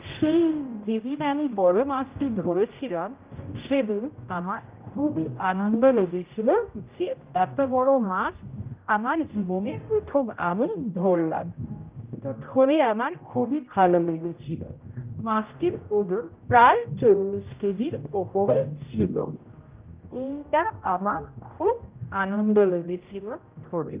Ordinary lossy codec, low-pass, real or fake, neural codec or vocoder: Opus, 24 kbps; 3.6 kHz; fake; codec, 16 kHz, 0.5 kbps, X-Codec, HuBERT features, trained on general audio